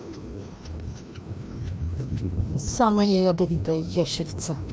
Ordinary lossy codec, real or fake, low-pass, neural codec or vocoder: none; fake; none; codec, 16 kHz, 1 kbps, FreqCodec, larger model